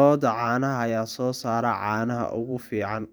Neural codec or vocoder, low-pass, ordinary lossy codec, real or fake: vocoder, 44.1 kHz, 128 mel bands every 512 samples, BigVGAN v2; none; none; fake